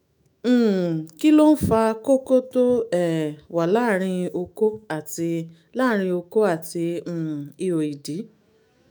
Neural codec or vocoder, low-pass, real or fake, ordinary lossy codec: autoencoder, 48 kHz, 128 numbers a frame, DAC-VAE, trained on Japanese speech; none; fake; none